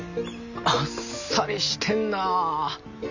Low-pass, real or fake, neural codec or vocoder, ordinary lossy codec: 7.2 kHz; real; none; none